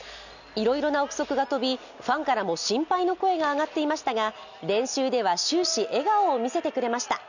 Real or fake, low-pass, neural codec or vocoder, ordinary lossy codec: real; 7.2 kHz; none; none